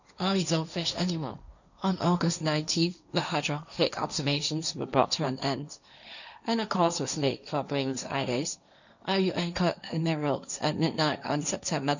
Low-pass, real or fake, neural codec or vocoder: 7.2 kHz; fake; codec, 16 kHz, 1.1 kbps, Voila-Tokenizer